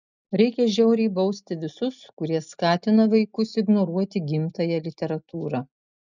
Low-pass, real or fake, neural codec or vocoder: 7.2 kHz; real; none